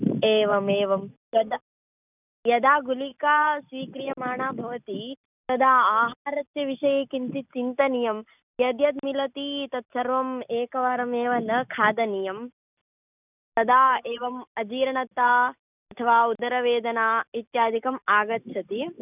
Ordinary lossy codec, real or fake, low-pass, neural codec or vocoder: none; real; 3.6 kHz; none